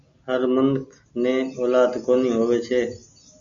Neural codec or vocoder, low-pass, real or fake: none; 7.2 kHz; real